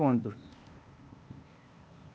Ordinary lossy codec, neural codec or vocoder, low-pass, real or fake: none; codec, 16 kHz, 0.8 kbps, ZipCodec; none; fake